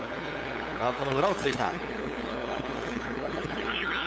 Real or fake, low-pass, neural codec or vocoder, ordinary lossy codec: fake; none; codec, 16 kHz, 8 kbps, FunCodec, trained on LibriTTS, 25 frames a second; none